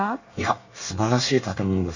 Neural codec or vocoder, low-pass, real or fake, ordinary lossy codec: codec, 24 kHz, 1 kbps, SNAC; 7.2 kHz; fake; none